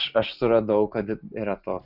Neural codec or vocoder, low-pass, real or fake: none; 5.4 kHz; real